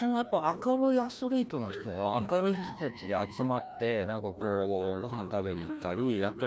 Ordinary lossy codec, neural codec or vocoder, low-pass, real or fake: none; codec, 16 kHz, 1 kbps, FreqCodec, larger model; none; fake